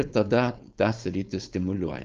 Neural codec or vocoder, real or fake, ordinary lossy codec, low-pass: codec, 16 kHz, 4.8 kbps, FACodec; fake; Opus, 24 kbps; 7.2 kHz